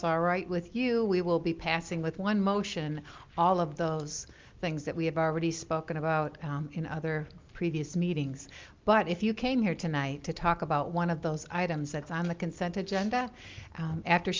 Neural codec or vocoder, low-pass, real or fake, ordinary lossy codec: none; 7.2 kHz; real; Opus, 24 kbps